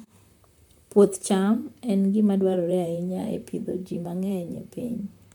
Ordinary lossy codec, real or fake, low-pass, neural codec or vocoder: MP3, 96 kbps; fake; 19.8 kHz; vocoder, 44.1 kHz, 128 mel bands, Pupu-Vocoder